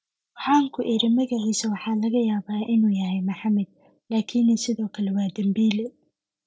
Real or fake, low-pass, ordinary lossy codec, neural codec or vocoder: real; none; none; none